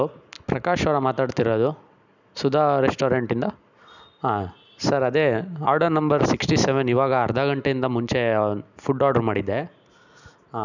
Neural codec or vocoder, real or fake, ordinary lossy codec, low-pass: none; real; none; 7.2 kHz